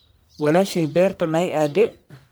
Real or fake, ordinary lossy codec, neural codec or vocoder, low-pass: fake; none; codec, 44.1 kHz, 1.7 kbps, Pupu-Codec; none